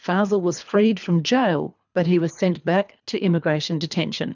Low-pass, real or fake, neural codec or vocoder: 7.2 kHz; fake; codec, 24 kHz, 3 kbps, HILCodec